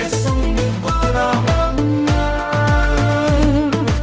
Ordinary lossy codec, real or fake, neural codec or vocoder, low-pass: none; fake; codec, 16 kHz, 0.5 kbps, X-Codec, HuBERT features, trained on balanced general audio; none